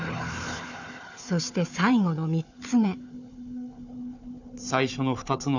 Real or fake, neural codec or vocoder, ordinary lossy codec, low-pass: fake; codec, 16 kHz, 4 kbps, FunCodec, trained on Chinese and English, 50 frames a second; none; 7.2 kHz